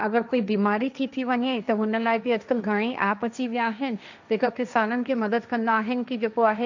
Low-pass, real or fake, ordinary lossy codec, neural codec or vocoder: 7.2 kHz; fake; none; codec, 16 kHz, 1.1 kbps, Voila-Tokenizer